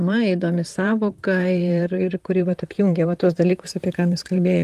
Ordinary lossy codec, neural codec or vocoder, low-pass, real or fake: Opus, 24 kbps; vocoder, 44.1 kHz, 128 mel bands, Pupu-Vocoder; 14.4 kHz; fake